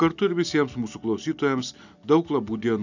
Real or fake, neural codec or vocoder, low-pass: real; none; 7.2 kHz